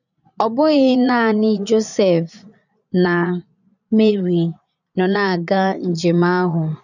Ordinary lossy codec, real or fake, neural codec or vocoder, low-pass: none; fake; vocoder, 22.05 kHz, 80 mel bands, Vocos; 7.2 kHz